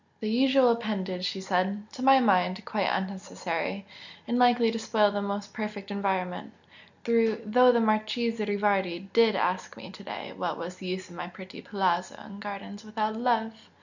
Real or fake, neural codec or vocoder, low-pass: real; none; 7.2 kHz